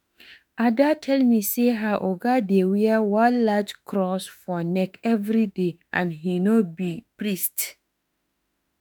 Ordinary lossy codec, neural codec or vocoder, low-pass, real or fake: none; autoencoder, 48 kHz, 32 numbers a frame, DAC-VAE, trained on Japanese speech; none; fake